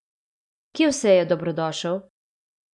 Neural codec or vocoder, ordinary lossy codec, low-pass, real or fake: none; none; 10.8 kHz; real